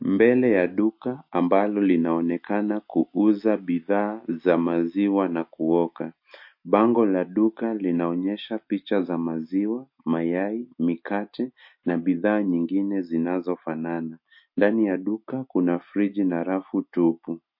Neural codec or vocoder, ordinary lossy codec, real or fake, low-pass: none; MP3, 32 kbps; real; 5.4 kHz